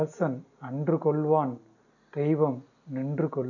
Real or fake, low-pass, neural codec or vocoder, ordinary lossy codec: real; 7.2 kHz; none; none